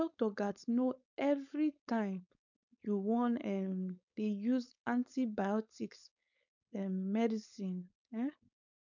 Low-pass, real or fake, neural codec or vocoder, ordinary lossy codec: 7.2 kHz; fake; codec, 16 kHz, 4.8 kbps, FACodec; none